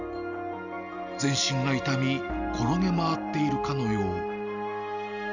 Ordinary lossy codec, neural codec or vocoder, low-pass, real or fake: none; none; 7.2 kHz; real